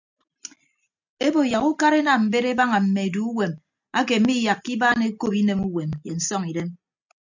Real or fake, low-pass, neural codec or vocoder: real; 7.2 kHz; none